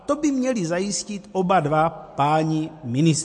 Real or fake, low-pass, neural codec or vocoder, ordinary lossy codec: real; 10.8 kHz; none; MP3, 48 kbps